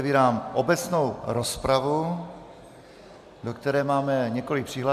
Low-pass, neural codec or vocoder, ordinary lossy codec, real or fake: 14.4 kHz; none; MP3, 96 kbps; real